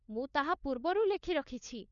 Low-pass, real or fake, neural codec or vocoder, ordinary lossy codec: 7.2 kHz; fake; codec, 16 kHz, 4 kbps, FunCodec, trained on LibriTTS, 50 frames a second; none